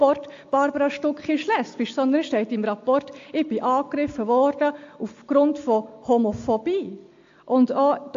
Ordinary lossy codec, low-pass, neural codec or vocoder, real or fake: AAC, 48 kbps; 7.2 kHz; none; real